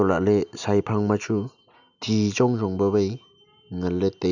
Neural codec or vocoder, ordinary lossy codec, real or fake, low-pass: none; none; real; 7.2 kHz